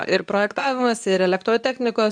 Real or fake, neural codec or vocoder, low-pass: fake; codec, 24 kHz, 0.9 kbps, WavTokenizer, medium speech release version 2; 9.9 kHz